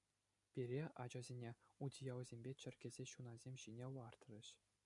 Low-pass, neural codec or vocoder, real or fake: 10.8 kHz; none; real